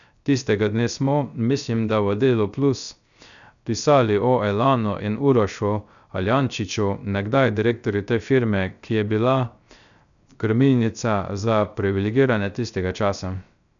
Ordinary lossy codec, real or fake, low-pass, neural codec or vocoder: none; fake; 7.2 kHz; codec, 16 kHz, 0.3 kbps, FocalCodec